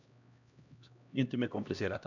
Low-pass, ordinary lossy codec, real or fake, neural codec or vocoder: 7.2 kHz; none; fake; codec, 16 kHz, 1 kbps, X-Codec, HuBERT features, trained on LibriSpeech